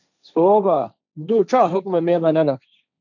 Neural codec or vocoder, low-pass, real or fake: codec, 16 kHz, 1.1 kbps, Voila-Tokenizer; 7.2 kHz; fake